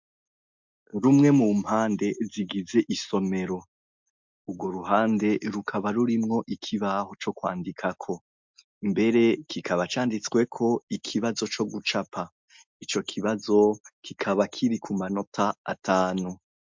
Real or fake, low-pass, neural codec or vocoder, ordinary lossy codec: real; 7.2 kHz; none; MP3, 64 kbps